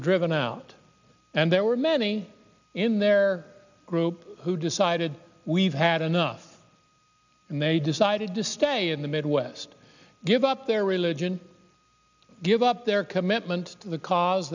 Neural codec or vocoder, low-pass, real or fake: none; 7.2 kHz; real